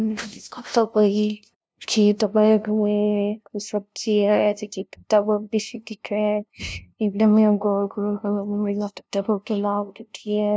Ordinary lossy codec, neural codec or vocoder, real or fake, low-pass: none; codec, 16 kHz, 0.5 kbps, FunCodec, trained on LibriTTS, 25 frames a second; fake; none